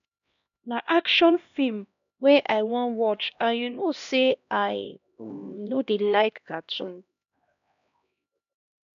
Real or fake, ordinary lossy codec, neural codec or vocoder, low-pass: fake; none; codec, 16 kHz, 1 kbps, X-Codec, HuBERT features, trained on LibriSpeech; 7.2 kHz